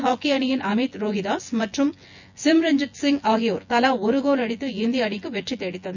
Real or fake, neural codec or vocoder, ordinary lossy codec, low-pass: fake; vocoder, 24 kHz, 100 mel bands, Vocos; none; 7.2 kHz